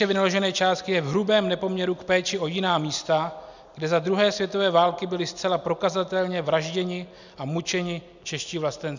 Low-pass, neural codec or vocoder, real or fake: 7.2 kHz; none; real